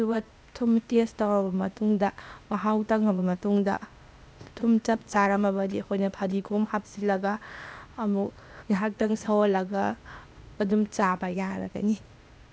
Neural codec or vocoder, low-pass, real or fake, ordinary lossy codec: codec, 16 kHz, 0.8 kbps, ZipCodec; none; fake; none